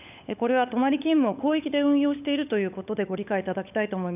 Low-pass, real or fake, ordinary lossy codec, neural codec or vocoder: 3.6 kHz; fake; none; codec, 16 kHz, 16 kbps, FunCodec, trained on LibriTTS, 50 frames a second